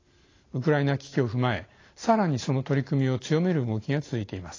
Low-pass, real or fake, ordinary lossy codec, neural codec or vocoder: 7.2 kHz; real; AAC, 32 kbps; none